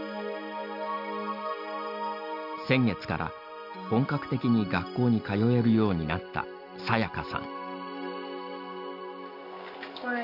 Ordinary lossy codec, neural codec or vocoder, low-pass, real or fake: none; none; 5.4 kHz; real